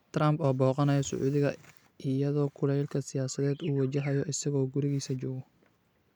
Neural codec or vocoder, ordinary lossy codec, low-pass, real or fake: none; none; 19.8 kHz; real